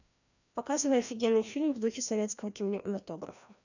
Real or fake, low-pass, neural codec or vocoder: fake; 7.2 kHz; codec, 16 kHz, 1 kbps, FreqCodec, larger model